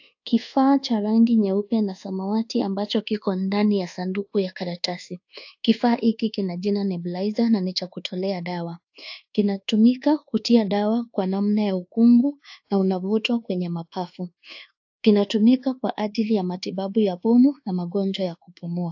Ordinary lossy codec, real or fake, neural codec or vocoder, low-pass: AAC, 48 kbps; fake; codec, 24 kHz, 1.2 kbps, DualCodec; 7.2 kHz